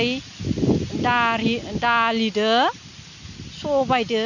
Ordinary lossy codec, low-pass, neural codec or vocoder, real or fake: none; 7.2 kHz; none; real